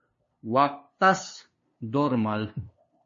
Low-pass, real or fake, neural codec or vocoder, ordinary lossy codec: 7.2 kHz; fake; codec, 16 kHz, 2 kbps, FunCodec, trained on LibriTTS, 25 frames a second; MP3, 32 kbps